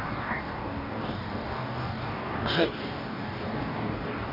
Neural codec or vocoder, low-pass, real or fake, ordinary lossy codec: codec, 44.1 kHz, 2.6 kbps, DAC; 5.4 kHz; fake; none